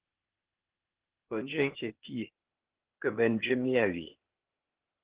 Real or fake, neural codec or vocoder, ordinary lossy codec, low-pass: fake; codec, 16 kHz, 0.8 kbps, ZipCodec; Opus, 16 kbps; 3.6 kHz